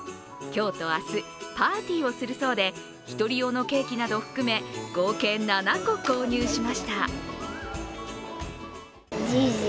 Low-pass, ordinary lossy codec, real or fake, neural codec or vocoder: none; none; real; none